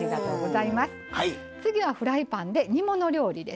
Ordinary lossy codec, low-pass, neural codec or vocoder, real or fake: none; none; none; real